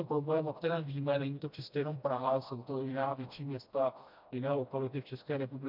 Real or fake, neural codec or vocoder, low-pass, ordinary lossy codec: fake; codec, 16 kHz, 1 kbps, FreqCodec, smaller model; 5.4 kHz; MP3, 48 kbps